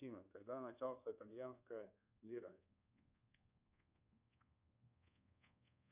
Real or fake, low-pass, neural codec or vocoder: fake; 3.6 kHz; codec, 24 kHz, 1.2 kbps, DualCodec